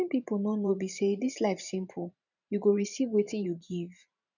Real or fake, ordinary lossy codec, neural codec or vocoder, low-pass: fake; none; vocoder, 44.1 kHz, 128 mel bands every 512 samples, BigVGAN v2; 7.2 kHz